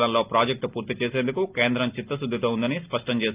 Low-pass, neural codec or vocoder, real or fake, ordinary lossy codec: 3.6 kHz; none; real; Opus, 24 kbps